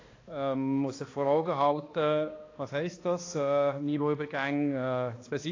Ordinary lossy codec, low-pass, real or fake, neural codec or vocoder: AAC, 32 kbps; 7.2 kHz; fake; codec, 16 kHz, 2 kbps, X-Codec, HuBERT features, trained on balanced general audio